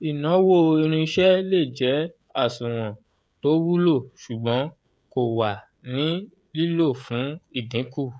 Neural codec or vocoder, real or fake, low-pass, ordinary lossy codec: codec, 16 kHz, 16 kbps, FreqCodec, smaller model; fake; none; none